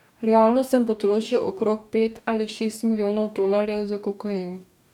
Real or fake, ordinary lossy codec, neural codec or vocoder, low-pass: fake; none; codec, 44.1 kHz, 2.6 kbps, DAC; 19.8 kHz